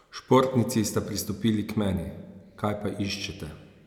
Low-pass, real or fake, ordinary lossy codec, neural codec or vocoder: 19.8 kHz; real; none; none